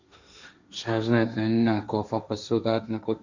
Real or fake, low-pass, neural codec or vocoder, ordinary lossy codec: fake; 7.2 kHz; codec, 16 kHz, 1.1 kbps, Voila-Tokenizer; Opus, 64 kbps